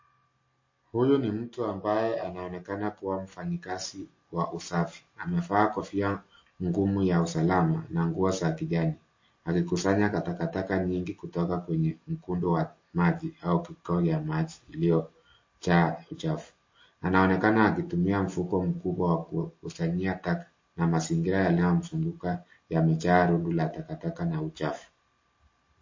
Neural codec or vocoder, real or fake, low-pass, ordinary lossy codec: none; real; 7.2 kHz; MP3, 32 kbps